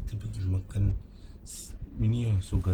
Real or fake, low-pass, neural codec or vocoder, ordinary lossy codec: real; 19.8 kHz; none; Opus, 16 kbps